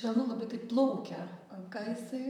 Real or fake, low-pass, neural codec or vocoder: fake; 19.8 kHz; vocoder, 44.1 kHz, 128 mel bands, Pupu-Vocoder